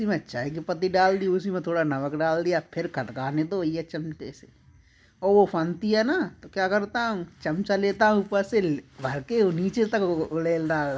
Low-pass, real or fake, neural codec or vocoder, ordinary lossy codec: none; real; none; none